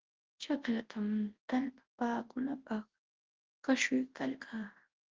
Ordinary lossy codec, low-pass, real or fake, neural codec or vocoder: Opus, 16 kbps; 7.2 kHz; fake; codec, 24 kHz, 0.9 kbps, WavTokenizer, large speech release